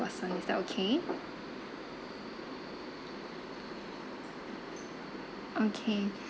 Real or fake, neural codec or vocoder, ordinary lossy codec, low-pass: real; none; none; none